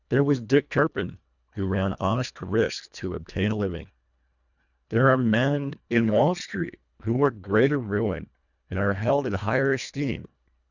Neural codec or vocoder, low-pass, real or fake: codec, 24 kHz, 1.5 kbps, HILCodec; 7.2 kHz; fake